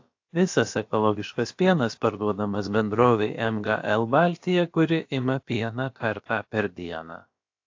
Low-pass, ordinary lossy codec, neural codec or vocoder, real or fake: 7.2 kHz; AAC, 48 kbps; codec, 16 kHz, about 1 kbps, DyCAST, with the encoder's durations; fake